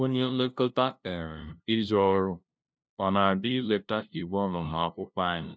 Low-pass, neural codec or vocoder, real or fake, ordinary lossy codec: none; codec, 16 kHz, 0.5 kbps, FunCodec, trained on LibriTTS, 25 frames a second; fake; none